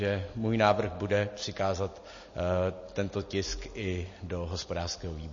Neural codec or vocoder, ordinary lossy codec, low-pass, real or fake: none; MP3, 32 kbps; 7.2 kHz; real